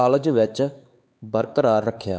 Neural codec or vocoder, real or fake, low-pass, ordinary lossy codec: codec, 16 kHz, 4 kbps, X-Codec, HuBERT features, trained on LibriSpeech; fake; none; none